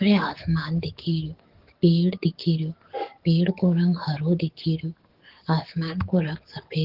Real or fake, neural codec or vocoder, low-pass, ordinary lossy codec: fake; codec, 44.1 kHz, 7.8 kbps, DAC; 5.4 kHz; Opus, 16 kbps